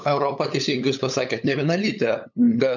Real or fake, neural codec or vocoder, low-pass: fake; codec, 16 kHz, 8 kbps, FunCodec, trained on LibriTTS, 25 frames a second; 7.2 kHz